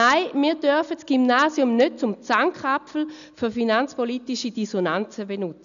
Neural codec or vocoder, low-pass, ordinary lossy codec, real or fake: none; 7.2 kHz; none; real